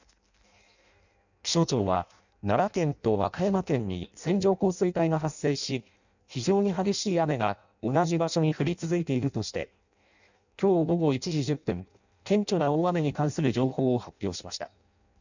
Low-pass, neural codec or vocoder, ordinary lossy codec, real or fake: 7.2 kHz; codec, 16 kHz in and 24 kHz out, 0.6 kbps, FireRedTTS-2 codec; none; fake